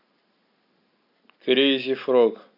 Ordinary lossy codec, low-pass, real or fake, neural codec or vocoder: none; 5.4 kHz; real; none